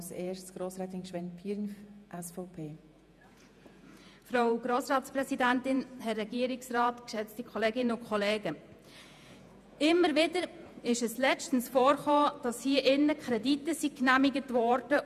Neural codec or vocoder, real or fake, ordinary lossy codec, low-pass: vocoder, 48 kHz, 128 mel bands, Vocos; fake; none; 14.4 kHz